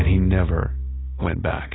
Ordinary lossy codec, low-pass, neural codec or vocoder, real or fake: AAC, 16 kbps; 7.2 kHz; none; real